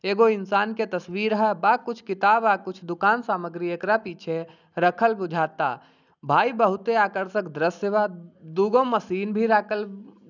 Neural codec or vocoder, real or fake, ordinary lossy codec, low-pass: none; real; none; 7.2 kHz